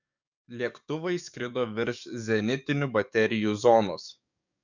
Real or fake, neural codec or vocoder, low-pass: fake; codec, 44.1 kHz, 7.8 kbps, DAC; 7.2 kHz